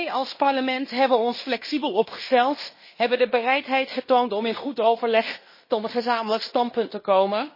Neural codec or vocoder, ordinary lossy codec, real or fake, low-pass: codec, 16 kHz in and 24 kHz out, 0.9 kbps, LongCat-Audio-Codec, fine tuned four codebook decoder; MP3, 24 kbps; fake; 5.4 kHz